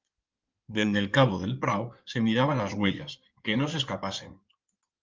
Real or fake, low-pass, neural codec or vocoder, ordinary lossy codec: fake; 7.2 kHz; codec, 16 kHz in and 24 kHz out, 2.2 kbps, FireRedTTS-2 codec; Opus, 32 kbps